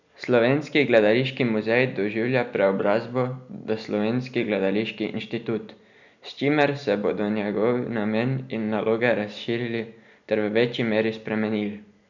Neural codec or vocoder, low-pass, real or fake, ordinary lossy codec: none; 7.2 kHz; real; none